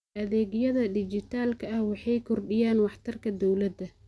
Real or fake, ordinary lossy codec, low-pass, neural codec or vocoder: real; none; none; none